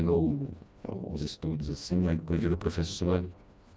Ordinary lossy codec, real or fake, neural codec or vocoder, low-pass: none; fake; codec, 16 kHz, 1 kbps, FreqCodec, smaller model; none